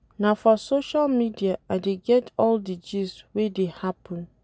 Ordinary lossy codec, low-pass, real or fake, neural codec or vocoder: none; none; real; none